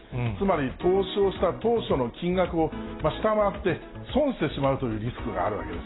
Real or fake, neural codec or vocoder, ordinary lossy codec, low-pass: real; none; AAC, 16 kbps; 7.2 kHz